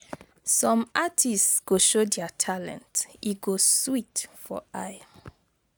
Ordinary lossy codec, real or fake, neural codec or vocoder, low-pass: none; real; none; none